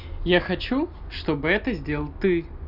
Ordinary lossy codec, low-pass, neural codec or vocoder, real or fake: none; 5.4 kHz; none; real